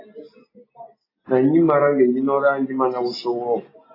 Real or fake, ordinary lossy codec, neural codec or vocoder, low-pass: real; AAC, 24 kbps; none; 5.4 kHz